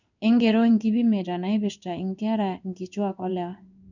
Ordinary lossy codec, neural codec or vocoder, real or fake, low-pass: none; codec, 16 kHz in and 24 kHz out, 1 kbps, XY-Tokenizer; fake; 7.2 kHz